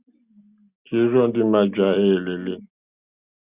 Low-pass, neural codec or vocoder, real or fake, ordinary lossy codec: 3.6 kHz; none; real; Opus, 64 kbps